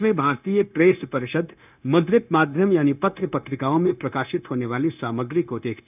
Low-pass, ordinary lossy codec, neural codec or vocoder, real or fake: 3.6 kHz; none; codec, 16 kHz, 0.9 kbps, LongCat-Audio-Codec; fake